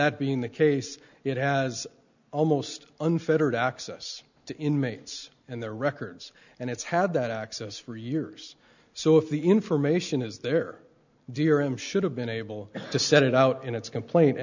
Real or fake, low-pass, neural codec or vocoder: real; 7.2 kHz; none